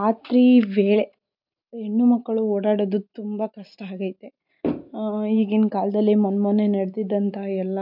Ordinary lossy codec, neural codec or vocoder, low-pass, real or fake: none; none; 5.4 kHz; real